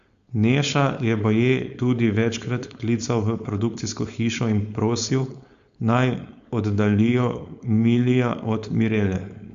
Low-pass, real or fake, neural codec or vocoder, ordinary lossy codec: 7.2 kHz; fake; codec, 16 kHz, 4.8 kbps, FACodec; Opus, 64 kbps